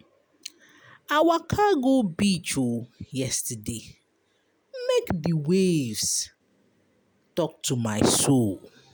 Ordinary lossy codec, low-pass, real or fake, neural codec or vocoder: none; none; real; none